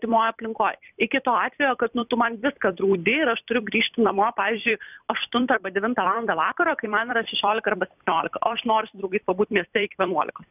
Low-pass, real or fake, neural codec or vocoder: 3.6 kHz; fake; vocoder, 44.1 kHz, 128 mel bands every 256 samples, BigVGAN v2